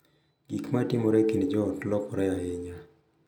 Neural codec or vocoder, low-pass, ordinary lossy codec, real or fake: none; 19.8 kHz; none; real